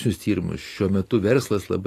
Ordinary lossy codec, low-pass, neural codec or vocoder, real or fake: AAC, 48 kbps; 14.4 kHz; none; real